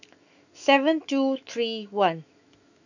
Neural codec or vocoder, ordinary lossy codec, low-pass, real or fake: autoencoder, 48 kHz, 128 numbers a frame, DAC-VAE, trained on Japanese speech; none; 7.2 kHz; fake